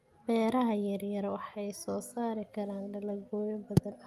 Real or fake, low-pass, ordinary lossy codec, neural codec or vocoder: real; 14.4 kHz; Opus, 32 kbps; none